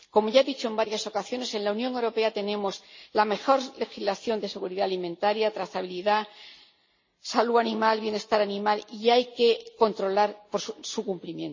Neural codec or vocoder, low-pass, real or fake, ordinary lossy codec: none; 7.2 kHz; real; MP3, 32 kbps